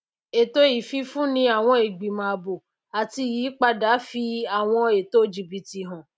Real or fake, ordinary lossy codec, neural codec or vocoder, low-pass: real; none; none; none